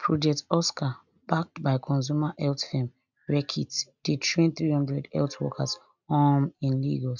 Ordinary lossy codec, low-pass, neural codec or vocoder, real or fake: none; 7.2 kHz; none; real